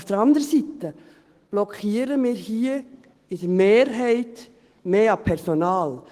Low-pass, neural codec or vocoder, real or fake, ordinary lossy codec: 14.4 kHz; autoencoder, 48 kHz, 128 numbers a frame, DAC-VAE, trained on Japanese speech; fake; Opus, 16 kbps